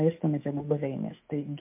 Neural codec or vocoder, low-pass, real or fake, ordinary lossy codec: autoencoder, 48 kHz, 128 numbers a frame, DAC-VAE, trained on Japanese speech; 3.6 kHz; fake; MP3, 32 kbps